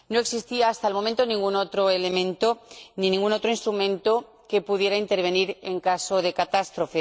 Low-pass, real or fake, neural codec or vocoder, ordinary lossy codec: none; real; none; none